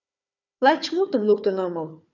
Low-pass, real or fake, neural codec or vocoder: 7.2 kHz; fake; codec, 16 kHz, 4 kbps, FunCodec, trained on Chinese and English, 50 frames a second